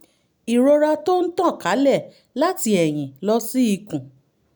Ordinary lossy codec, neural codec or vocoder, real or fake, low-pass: none; none; real; none